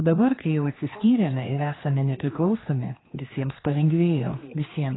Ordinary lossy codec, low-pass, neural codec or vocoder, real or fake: AAC, 16 kbps; 7.2 kHz; codec, 16 kHz, 2 kbps, X-Codec, HuBERT features, trained on general audio; fake